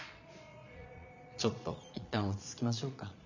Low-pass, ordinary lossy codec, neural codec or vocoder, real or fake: 7.2 kHz; none; vocoder, 22.05 kHz, 80 mel bands, Vocos; fake